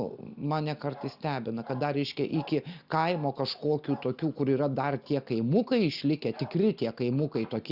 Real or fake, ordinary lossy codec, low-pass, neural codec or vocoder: real; Opus, 64 kbps; 5.4 kHz; none